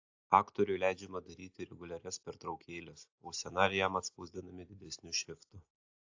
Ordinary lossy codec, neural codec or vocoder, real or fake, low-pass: AAC, 48 kbps; none; real; 7.2 kHz